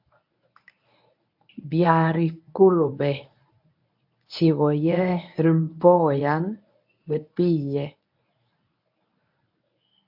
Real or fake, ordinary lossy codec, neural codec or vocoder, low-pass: fake; AAC, 48 kbps; codec, 24 kHz, 0.9 kbps, WavTokenizer, medium speech release version 1; 5.4 kHz